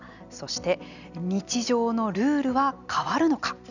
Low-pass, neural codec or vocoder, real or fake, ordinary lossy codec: 7.2 kHz; none; real; none